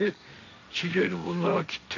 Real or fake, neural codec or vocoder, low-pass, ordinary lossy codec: fake; codec, 16 kHz, 1.1 kbps, Voila-Tokenizer; 7.2 kHz; none